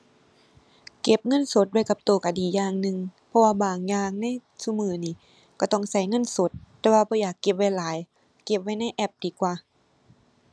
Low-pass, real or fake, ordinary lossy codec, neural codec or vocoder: none; real; none; none